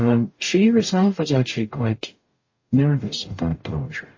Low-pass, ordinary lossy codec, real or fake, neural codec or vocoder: 7.2 kHz; MP3, 32 kbps; fake; codec, 44.1 kHz, 0.9 kbps, DAC